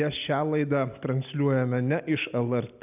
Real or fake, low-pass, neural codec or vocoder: real; 3.6 kHz; none